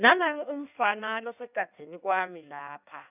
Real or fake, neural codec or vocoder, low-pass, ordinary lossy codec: fake; codec, 16 kHz in and 24 kHz out, 1.1 kbps, FireRedTTS-2 codec; 3.6 kHz; none